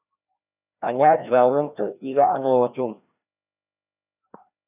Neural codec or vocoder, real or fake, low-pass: codec, 16 kHz, 1 kbps, FreqCodec, larger model; fake; 3.6 kHz